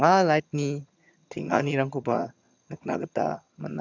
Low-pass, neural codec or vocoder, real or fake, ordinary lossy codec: 7.2 kHz; vocoder, 22.05 kHz, 80 mel bands, HiFi-GAN; fake; none